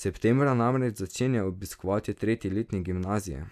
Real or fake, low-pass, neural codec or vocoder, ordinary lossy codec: real; 14.4 kHz; none; none